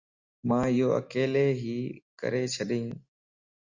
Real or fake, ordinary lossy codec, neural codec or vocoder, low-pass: real; Opus, 64 kbps; none; 7.2 kHz